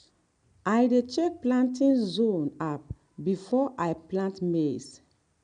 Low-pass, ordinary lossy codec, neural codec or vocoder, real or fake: 9.9 kHz; none; none; real